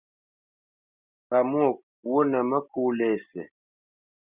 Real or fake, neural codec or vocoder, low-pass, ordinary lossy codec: real; none; 3.6 kHz; Opus, 64 kbps